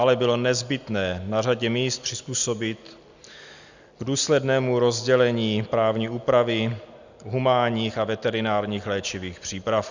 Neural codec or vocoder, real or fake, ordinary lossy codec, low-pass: none; real; Opus, 64 kbps; 7.2 kHz